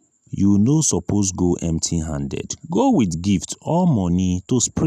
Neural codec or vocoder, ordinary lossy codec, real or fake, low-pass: none; none; real; 14.4 kHz